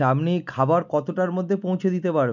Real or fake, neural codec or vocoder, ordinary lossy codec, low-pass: real; none; none; 7.2 kHz